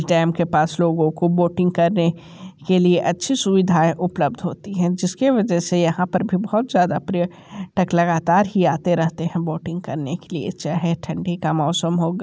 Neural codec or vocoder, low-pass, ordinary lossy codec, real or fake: none; none; none; real